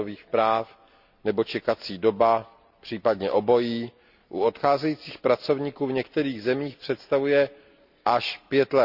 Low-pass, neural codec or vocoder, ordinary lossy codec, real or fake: 5.4 kHz; none; Opus, 64 kbps; real